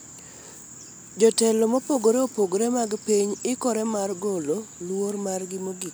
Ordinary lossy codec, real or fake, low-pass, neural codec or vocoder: none; real; none; none